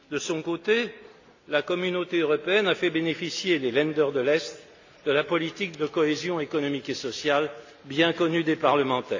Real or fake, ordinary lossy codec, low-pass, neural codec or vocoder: real; AAC, 48 kbps; 7.2 kHz; none